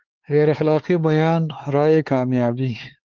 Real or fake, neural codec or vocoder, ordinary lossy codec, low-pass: fake; codec, 16 kHz, 4 kbps, X-Codec, HuBERT features, trained on LibriSpeech; Opus, 16 kbps; 7.2 kHz